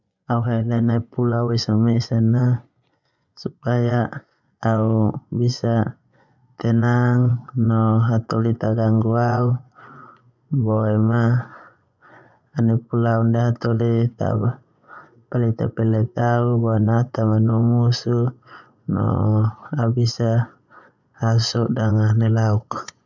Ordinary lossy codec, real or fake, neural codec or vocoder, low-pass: none; fake; vocoder, 24 kHz, 100 mel bands, Vocos; 7.2 kHz